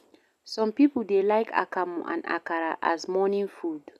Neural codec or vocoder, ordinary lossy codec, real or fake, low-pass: none; none; real; 14.4 kHz